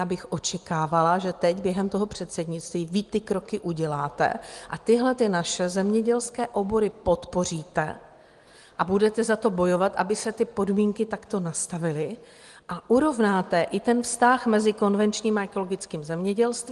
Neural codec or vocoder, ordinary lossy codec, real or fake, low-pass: none; Opus, 24 kbps; real; 10.8 kHz